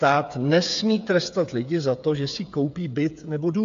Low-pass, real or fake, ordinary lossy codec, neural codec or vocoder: 7.2 kHz; fake; AAC, 64 kbps; codec, 16 kHz, 8 kbps, FreqCodec, smaller model